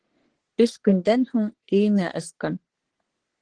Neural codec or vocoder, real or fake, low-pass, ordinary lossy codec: codec, 44.1 kHz, 3.4 kbps, Pupu-Codec; fake; 9.9 kHz; Opus, 16 kbps